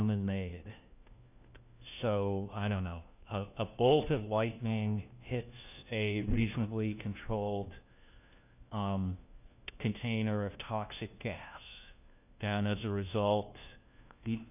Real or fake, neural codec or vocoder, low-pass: fake; codec, 16 kHz, 1 kbps, FunCodec, trained on LibriTTS, 50 frames a second; 3.6 kHz